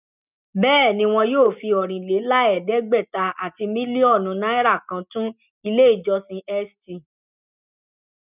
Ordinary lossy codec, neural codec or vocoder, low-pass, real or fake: none; none; 3.6 kHz; real